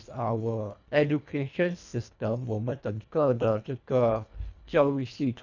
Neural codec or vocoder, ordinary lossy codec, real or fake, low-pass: codec, 24 kHz, 1.5 kbps, HILCodec; none; fake; 7.2 kHz